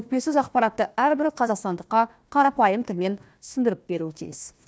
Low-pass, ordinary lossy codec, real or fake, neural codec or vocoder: none; none; fake; codec, 16 kHz, 1 kbps, FunCodec, trained on Chinese and English, 50 frames a second